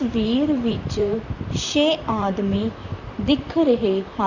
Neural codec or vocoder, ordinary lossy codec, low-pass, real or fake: vocoder, 44.1 kHz, 128 mel bands, Pupu-Vocoder; none; 7.2 kHz; fake